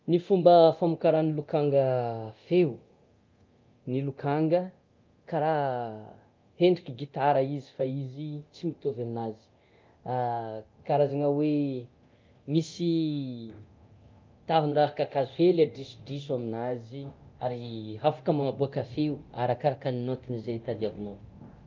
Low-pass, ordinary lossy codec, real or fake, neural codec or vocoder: 7.2 kHz; Opus, 24 kbps; fake; codec, 24 kHz, 0.9 kbps, DualCodec